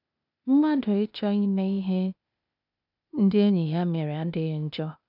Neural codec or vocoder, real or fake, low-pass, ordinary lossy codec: codec, 16 kHz, 0.8 kbps, ZipCodec; fake; 5.4 kHz; none